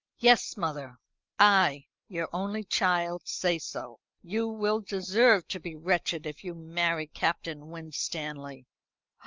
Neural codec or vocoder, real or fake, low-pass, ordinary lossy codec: none; real; 7.2 kHz; Opus, 24 kbps